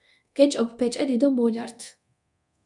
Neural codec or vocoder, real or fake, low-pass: codec, 24 kHz, 0.9 kbps, DualCodec; fake; 10.8 kHz